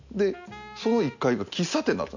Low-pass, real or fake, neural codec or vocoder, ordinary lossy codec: 7.2 kHz; real; none; none